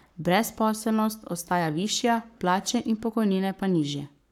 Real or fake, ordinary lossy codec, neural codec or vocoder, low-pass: fake; none; codec, 44.1 kHz, 7.8 kbps, Pupu-Codec; 19.8 kHz